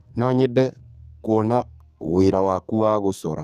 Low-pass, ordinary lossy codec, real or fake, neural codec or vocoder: 14.4 kHz; none; fake; codec, 44.1 kHz, 2.6 kbps, SNAC